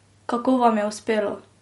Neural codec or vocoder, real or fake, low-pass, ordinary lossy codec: none; real; 19.8 kHz; MP3, 48 kbps